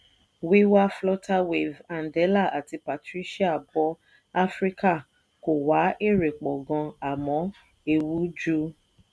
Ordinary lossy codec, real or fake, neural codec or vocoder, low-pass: none; real; none; none